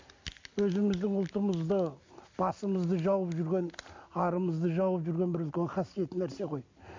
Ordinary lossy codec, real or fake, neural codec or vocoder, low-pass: MP3, 48 kbps; real; none; 7.2 kHz